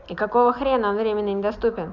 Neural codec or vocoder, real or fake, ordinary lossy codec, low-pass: none; real; none; 7.2 kHz